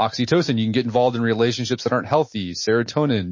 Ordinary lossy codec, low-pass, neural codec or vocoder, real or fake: MP3, 32 kbps; 7.2 kHz; none; real